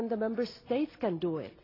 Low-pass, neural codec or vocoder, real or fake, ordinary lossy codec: 5.4 kHz; none; real; AAC, 24 kbps